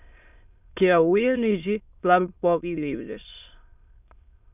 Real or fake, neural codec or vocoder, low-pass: fake; autoencoder, 22.05 kHz, a latent of 192 numbers a frame, VITS, trained on many speakers; 3.6 kHz